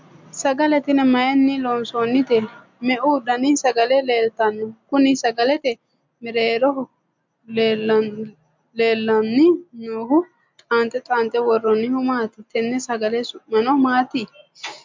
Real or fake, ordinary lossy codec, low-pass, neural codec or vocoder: real; MP3, 64 kbps; 7.2 kHz; none